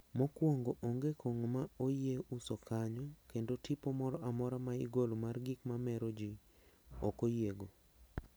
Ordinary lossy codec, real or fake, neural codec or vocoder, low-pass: none; real; none; none